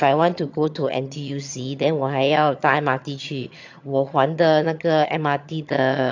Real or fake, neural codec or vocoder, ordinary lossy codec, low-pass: fake; vocoder, 22.05 kHz, 80 mel bands, HiFi-GAN; AAC, 48 kbps; 7.2 kHz